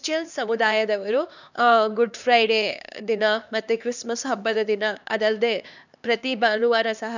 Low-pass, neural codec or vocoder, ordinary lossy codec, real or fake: 7.2 kHz; codec, 16 kHz, 2 kbps, X-Codec, HuBERT features, trained on LibriSpeech; none; fake